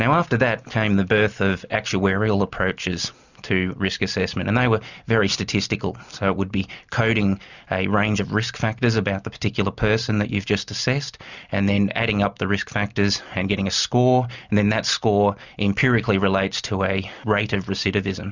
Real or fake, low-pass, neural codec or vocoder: real; 7.2 kHz; none